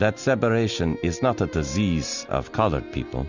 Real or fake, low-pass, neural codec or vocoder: real; 7.2 kHz; none